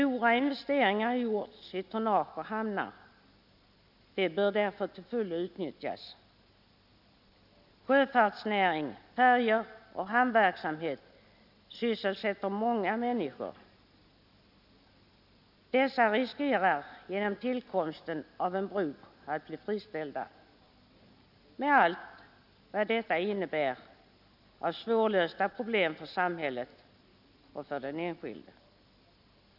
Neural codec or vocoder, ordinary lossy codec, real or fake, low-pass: none; none; real; 5.4 kHz